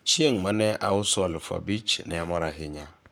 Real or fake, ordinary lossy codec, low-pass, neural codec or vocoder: fake; none; none; codec, 44.1 kHz, 7.8 kbps, Pupu-Codec